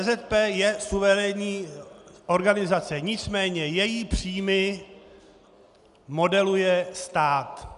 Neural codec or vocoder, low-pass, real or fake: none; 10.8 kHz; real